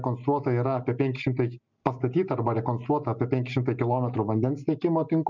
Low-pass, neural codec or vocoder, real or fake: 7.2 kHz; none; real